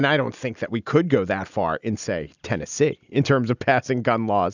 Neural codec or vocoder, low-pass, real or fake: none; 7.2 kHz; real